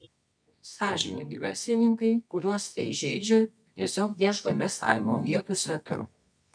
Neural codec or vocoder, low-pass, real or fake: codec, 24 kHz, 0.9 kbps, WavTokenizer, medium music audio release; 9.9 kHz; fake